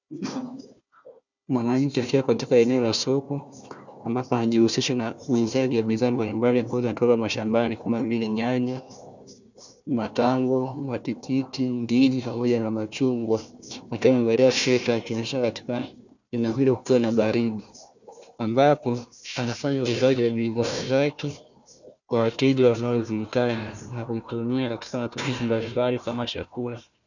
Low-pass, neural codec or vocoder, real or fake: 7.2 kHz; codec, 16 kHz, 1 kbps, FunCodec, trained on Chinese and English, 50 frames a second; fake